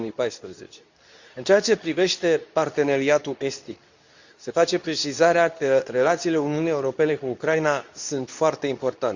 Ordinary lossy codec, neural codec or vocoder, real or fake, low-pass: Opus, 64 kbps; codec, 24 kHz, 0.9 kbps, WavTokenizer, medium speech release version 2; fake; 7.2 kHz